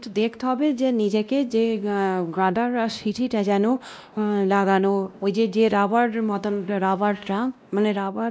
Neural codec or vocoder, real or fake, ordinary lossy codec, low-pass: codec, 16 kHz, 1 kbps, X-Codec, WavLM features, trained on Multilingual LibriSpeech; fake; none; none